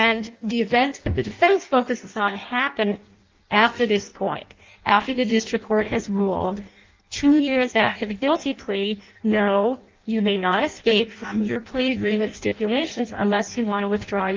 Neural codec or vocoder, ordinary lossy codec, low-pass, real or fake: codec, 16 kHz in and 24 kHz out, 0.6 kbps, FireRedTTS-2 codec; Opus, 32 kbps; 7.2 kHz; fake